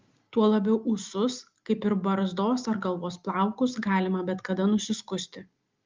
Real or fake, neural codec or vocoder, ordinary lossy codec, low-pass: real; none; Opus, 32 kbps; 7.2 kHz